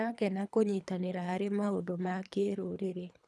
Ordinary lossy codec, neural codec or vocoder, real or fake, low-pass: none; codec, 24 kHz, 3 kbps, HILCodec; fake; none